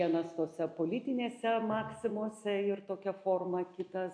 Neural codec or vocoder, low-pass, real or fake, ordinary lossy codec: none; 9.9 kHz; real; AAC, 48 kbps